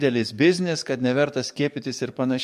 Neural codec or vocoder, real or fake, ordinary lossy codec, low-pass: codec, 44.1 kHz, 7.8 kbps, DAC; fake; MP3, 64 kbps; 14.4 kHz